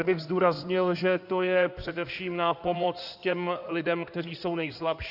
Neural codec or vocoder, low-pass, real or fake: codec, 16 kHz in and 24 kHz out, 2.2 kbps, FireRedTTS-2 codec; 5.4 kHz; fake